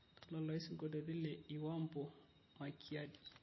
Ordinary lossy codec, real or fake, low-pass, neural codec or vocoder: MP3, 24 kbps; real; 7.2 kHz; none